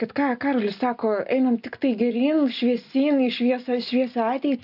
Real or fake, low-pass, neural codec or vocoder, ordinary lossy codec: real; 5.4 kHz; none; AAC, 48 kbps